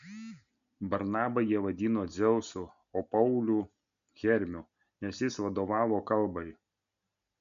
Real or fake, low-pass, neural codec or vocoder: real; 7.2 kHz; none